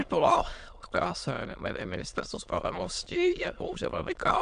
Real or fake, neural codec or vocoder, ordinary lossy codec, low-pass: fake; autoencoder, 22.05 kHz, a latent of 192 numbers a frame, VITS, trained on many speakers; MP3, 96 kbps; 9.9 kHz